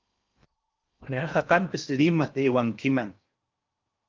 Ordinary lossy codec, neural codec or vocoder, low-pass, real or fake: Opus, 24 kbps; codec, 16 kHz in and 24 kHz out, 0.8 kbps, FocalCodec, streaming, 65536 codes; 7.2 kHz; fake